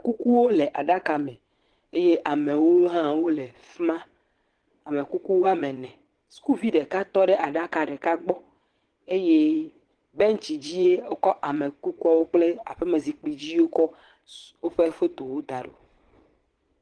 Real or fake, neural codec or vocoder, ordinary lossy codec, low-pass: fake; vocoder, 22.05 kHz, 80 mel bands, Vocos; Opus, 16 kbps; 9.9 kHz